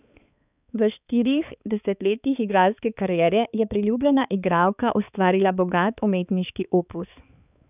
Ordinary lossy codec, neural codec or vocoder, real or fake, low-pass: none; codec, 16 kHz, 4 kbps, X-Codec, HuBERT features, trained on balanced general audio; fake; 3.6 kHz